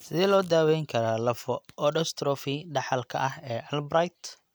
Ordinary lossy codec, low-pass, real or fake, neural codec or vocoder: none; none; real; none